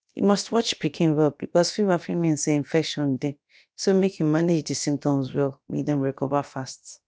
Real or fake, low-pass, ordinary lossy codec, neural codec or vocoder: fake; none; none; codec, 16 kHz, about 1 kbps, DyCAST, with the encoder's durations